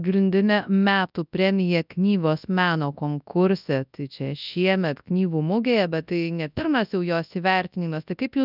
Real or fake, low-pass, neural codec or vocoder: fake; 5.4 kHz; codec, 24 kHz, 0.9 kbps, WavTokenizer, large speech release